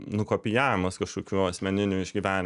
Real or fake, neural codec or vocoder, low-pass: real; none; 10.8 kHz